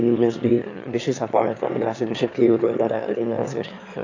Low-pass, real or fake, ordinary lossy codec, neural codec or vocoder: 7.2 kHz; fake; AAC, 48 kbps; autoencoder, 22.05 kHz, a latent of 192 numbers a frame, VITS, trained on one speaker